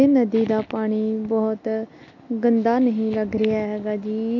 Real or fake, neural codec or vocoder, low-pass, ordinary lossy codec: real; none; 7.2 kHz; AAC, 48 kbps